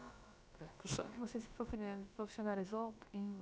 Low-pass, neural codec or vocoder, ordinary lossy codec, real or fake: none; codec, 16 kHz, about 1 kbps, DyCAST, with the encoder's durations; none; fake